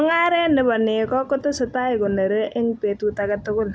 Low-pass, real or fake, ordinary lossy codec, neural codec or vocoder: none; real; none; none